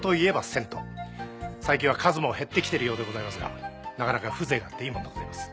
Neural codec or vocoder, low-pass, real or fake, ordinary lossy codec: none; none; real; none